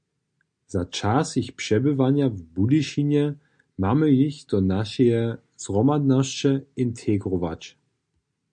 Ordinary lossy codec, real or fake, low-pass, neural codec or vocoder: MP3, 64 kbps; real; 9.9 kHz; none